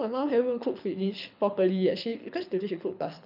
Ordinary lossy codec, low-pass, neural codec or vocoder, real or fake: none; 5.4 kHz; codec, 24 kHz, 6 kbps, HILCodec; fake